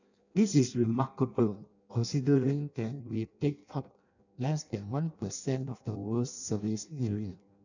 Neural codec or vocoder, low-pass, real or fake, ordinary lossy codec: codec, 16 kHz in and 24 kHz out, 0.6 kbps, FireRedTTS-2 codec; 7.2 kHz; fake; none